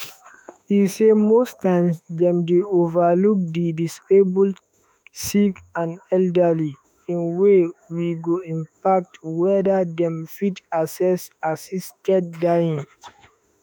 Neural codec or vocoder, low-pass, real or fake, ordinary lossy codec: autoencoder, 48 kHz, 32 numbers a frame, DAC-VAE, trained on Japanese speech; none; fake; none